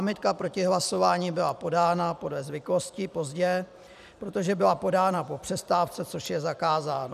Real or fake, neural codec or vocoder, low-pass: fake; vocoder, 44.1 kHz, 128 mel bands every 256 samples, BigVGAN v2; 14.4 kHz